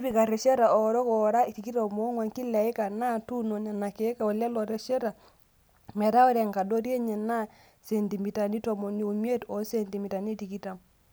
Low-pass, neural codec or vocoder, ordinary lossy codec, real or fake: none; none; none; real